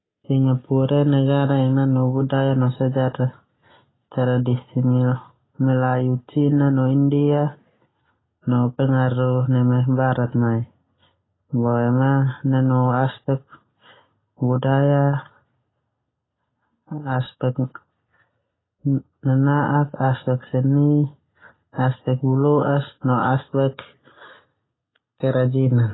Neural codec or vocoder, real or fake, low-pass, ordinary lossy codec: none; real; 7.2 kHz; AAC, 16 kbps